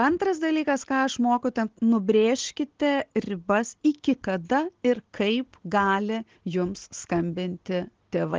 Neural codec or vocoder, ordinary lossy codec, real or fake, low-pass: none; Opus, 16 kbps; real; 7.2 kHz